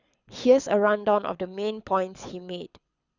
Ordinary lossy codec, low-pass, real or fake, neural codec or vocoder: Opus, 64 kbps; 7.2 kHz; fake; codec, 24 kHz, 6 kbps, HILCodec